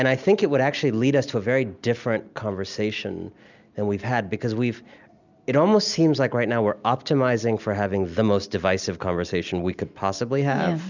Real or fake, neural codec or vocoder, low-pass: real; none; 7.2 kHz